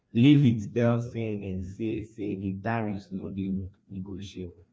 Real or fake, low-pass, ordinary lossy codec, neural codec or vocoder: fake; none; none; codec, 16 kHz, 1 kbps, FreqCodec, larger model